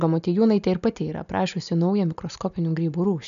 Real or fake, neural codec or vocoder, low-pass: real; none; 7.2 kHz